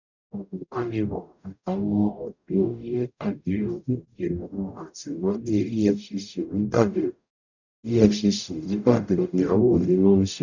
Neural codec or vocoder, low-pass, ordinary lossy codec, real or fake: codec, 44.1 kHz, 0.9 kbps, DAC; 7.2 kHz; none; fake